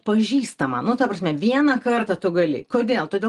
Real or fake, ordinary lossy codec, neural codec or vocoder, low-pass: real; Opus, 24 kbps; none; 10.8 kHz